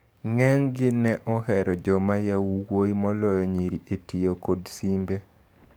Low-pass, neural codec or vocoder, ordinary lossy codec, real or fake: none; codec, 44.1 kHz, 7.8 kbps, DAC; none; fake